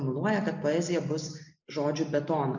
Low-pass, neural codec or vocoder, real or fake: 7.2 kHz; none; real